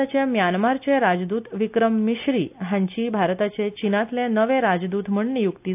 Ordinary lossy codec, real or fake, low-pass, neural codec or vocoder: AAC, 32 kbps; real; 3.6 kHz; none